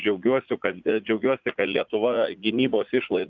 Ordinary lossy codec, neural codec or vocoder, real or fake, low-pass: Opus, 64 kbps; vocoder, 44.1 kHz, 80 mel bands, Vocos; fake; 7.2 kHz